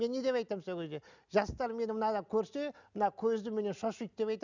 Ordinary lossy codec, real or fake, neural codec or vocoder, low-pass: none; real; none; 7.2 kHz